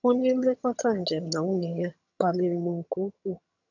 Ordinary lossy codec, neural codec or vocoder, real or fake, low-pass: none; vocoder, 22.05 kHz, 80 mel bands, HiFi-GAN; fake; 7.2 kHz